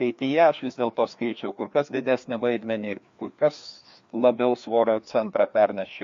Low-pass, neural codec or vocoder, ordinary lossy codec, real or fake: 7.2 kHz; codec, 16 kHz, 2 kbps, FreqCodec, larger model; MP3, 48 kbps; fake